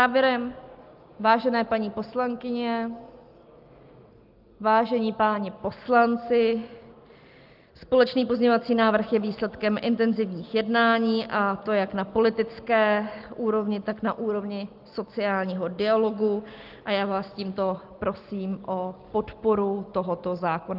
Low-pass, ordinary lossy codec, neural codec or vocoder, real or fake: 5.4 kHz; Opus, 32 kbps; none; real